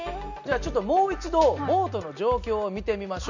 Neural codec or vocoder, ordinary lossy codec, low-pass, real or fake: none; none; 7.2 kHz; real